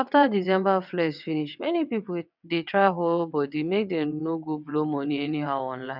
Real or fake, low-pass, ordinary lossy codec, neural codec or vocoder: fake; 5.4 kHz; none; vocoder, 22.05 kHz, 80 mel bands, WaveNeXt